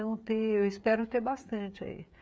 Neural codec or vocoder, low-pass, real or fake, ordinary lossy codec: codec, 16 kHz, 16 kbps, FreqCodec, smaller model; none; fake; none